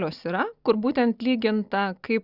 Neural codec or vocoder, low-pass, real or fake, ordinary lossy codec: none; 5.4 kHz; real; Opus, 64 kbps